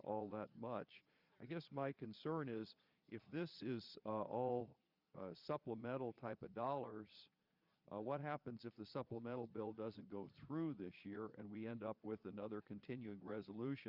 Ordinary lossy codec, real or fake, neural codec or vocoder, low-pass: Opus, 64 kbps; fake; vocoder, 22.05 kHz, 80 mel bands, Vocos; 5.4 kHz